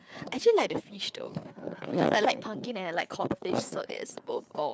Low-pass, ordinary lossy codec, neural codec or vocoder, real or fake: none; none; codec, 16 kHz, 4 kbps, FreqCodec, larger model; fake